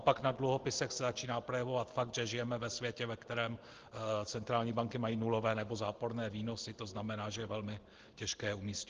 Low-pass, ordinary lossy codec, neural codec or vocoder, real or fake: 7.2 kHz; Opus, 16 kbps; none; real